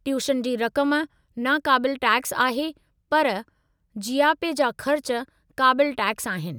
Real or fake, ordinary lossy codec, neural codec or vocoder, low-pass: real; none; none; none